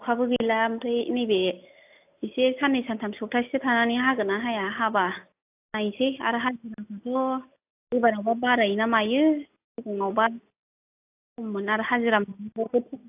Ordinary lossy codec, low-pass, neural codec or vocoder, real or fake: AAC, 32 kbps; 3.6 kHz; none; real